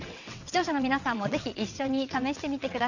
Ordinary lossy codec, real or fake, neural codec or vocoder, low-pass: none; fake; vocoder, 22.05 kHz, 80 mel bands, WaveNeXt; 7.2 kHz